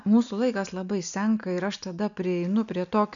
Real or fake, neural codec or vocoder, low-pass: real; none; 7.2 kHz